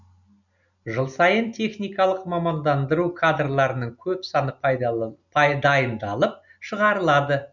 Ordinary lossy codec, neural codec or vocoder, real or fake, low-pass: none; none; real; 7.2 kHz